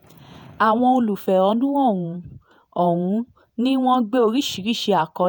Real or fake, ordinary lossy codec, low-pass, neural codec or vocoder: fake; none; none; vocoder, 48 kHz, 128 mel bands, Vocos